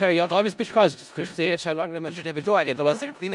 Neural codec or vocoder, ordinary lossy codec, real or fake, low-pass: codec, 16 kHz in and 24 kHz out, 0.4 kbps, LongCat-Audio-Codec, four codebook decoder; MP3, 96 kbps; fake; 10.8 kHz